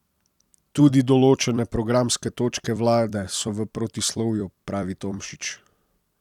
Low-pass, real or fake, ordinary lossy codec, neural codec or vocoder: 19.8 kHz; fake; none; vocoder, 44.1 kHz, 128 mel bands every 256 samples, BigVGAN v2